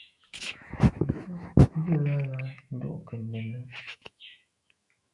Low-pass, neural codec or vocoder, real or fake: 10.8 kHz; autoencoder, 48 kHz, 128 numbers a frame, DAC-VAE, trained on Japanese speech; fake